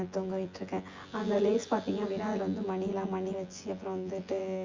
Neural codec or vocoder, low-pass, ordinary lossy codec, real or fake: vocoder, 24 kHz, 100 mel bands, Vocos; 7.2 kHz; Opus, 32 kbps; fake